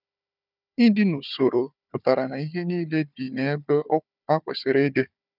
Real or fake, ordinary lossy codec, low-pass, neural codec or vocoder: fake; none; 5.4 kHz; codec, 16 kHz, 4 kbps, FunCodec, trained on Chinese and English, 50 frames a second